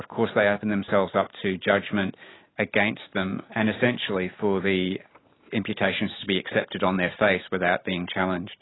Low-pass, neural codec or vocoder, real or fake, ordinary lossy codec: 7.2 kHz; none; real; AAC, 16 kbps